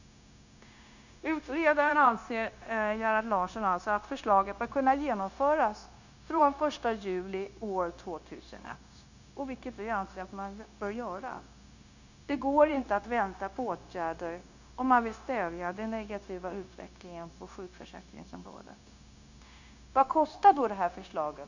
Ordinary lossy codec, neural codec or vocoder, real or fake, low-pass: none; codec, 16 kHz, 0.9 kbps, LongCat-Audio-Codec; fake; 7.2 kHz